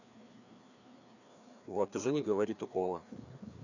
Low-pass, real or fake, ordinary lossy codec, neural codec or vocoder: 7.2 kHz; fake; none; codec, 16 kHz, 2 kbps, FreqCodec, larger model